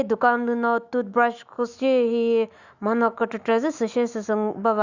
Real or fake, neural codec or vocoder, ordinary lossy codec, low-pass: fake; autoencoder, 48 kHz, 128 numbers a frame, DAC-VAE, trained on Japanese speech; Opus, 64 kbps; 7.2 kHz